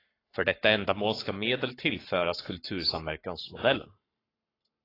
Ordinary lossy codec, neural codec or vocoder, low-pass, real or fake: AAC, 24 kbps; codec, 44.1 kHz, 7.8 kbps, Pupu-Codec; 5.4 kHz; fake